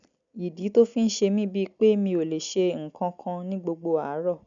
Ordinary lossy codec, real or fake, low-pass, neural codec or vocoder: none; real; 7.2 kHz; none